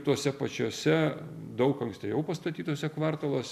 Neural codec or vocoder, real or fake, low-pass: none; real; 14.4 kHz